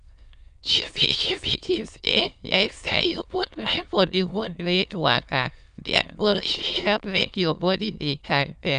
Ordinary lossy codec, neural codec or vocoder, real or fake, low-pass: none; autoencoder, 22.05 kHz, a latent of 192 numbers a frame, VITS, trained on many speakers; fake; 9.9 kHz